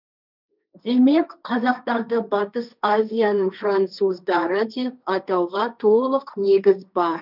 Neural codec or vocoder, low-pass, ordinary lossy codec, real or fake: codec, 16 kHz, 1.1 kbps, Voila-Tokenizer; 5.4 kHz; none; fake